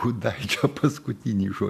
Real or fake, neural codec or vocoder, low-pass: real; none; 14.4 kHz